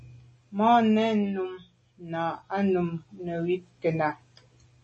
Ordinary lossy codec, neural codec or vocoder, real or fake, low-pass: MP3, 32 kbps; none; real; 10.8 kHz